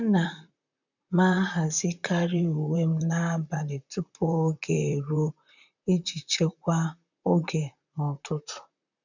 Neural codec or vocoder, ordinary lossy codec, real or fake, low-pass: vocoder, 44.1 kHz, 128 mel bands, Pupu-Vocoder; none; fake; 7.2 kHz